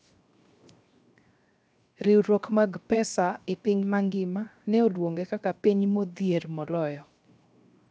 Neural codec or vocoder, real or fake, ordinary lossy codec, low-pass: codec, 16 kHz, 0.7 kbps, FocalCodec; fake; none; none